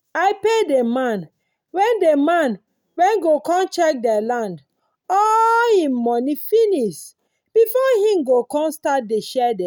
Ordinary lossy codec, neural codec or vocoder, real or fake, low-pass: none; none; real; 19.8 kHz